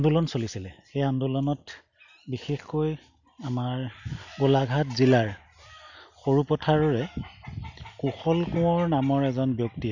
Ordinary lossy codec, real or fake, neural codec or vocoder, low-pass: none; real; none; 7.2 kHz